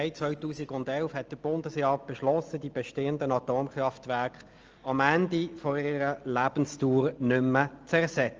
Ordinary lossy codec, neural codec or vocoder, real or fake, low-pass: Opus, 24 kbps; none; real; 7.2 kHz